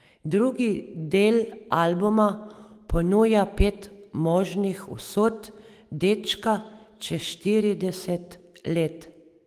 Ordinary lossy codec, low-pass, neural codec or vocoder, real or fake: Opus, 24 kbps; 14.4 kHz; autoencoder, 48 kHz, 128 numbers a frame, DAC-VAE, trained on Japanese speech; fake